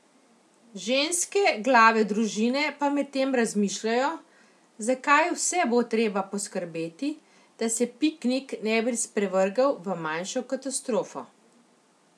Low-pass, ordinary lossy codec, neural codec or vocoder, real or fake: none; none; none; real